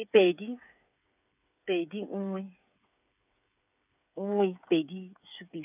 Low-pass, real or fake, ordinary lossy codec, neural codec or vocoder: 3.6 kHz; fake; none; codec, 16 kHz, 8 kbps, FreqCodec, smaller model